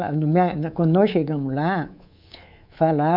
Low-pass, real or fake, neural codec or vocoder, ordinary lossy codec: 5.4 kHz; fake; codec, 24 kHz, 3.1 kbps, DualCodec; none